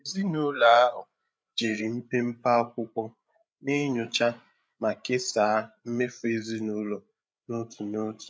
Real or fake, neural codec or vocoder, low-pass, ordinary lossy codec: fake; codec, 16 kHz, 8 kbps, FreqCodec, larger model; none; none